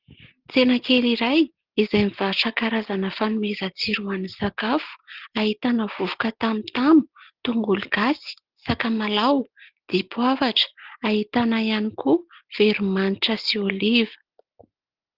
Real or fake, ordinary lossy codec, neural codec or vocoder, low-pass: real; Opus, 16 kbps; none; 5.4 kHz